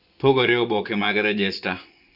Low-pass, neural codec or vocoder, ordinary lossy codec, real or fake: 5.4 kHz; vocoder, 24 kHz, 100 mel bands, Vocos; none; fake